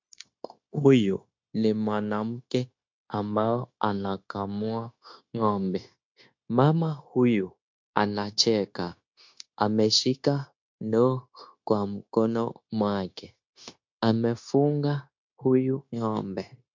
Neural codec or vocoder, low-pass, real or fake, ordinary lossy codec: codec, 16 kHz, 0.9 kbps, LongCat-Audio-Codec; 7.2 kHz; fake; MP3, 48 kbps